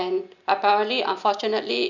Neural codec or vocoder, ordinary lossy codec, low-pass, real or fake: none; none; 7.2 kHz; real